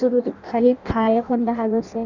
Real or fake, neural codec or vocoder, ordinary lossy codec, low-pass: fake; codec, 16 kHz in and 24 kHz out, 0.6 kbps, FireRedTTS-2 codec; none; 7.2 kHz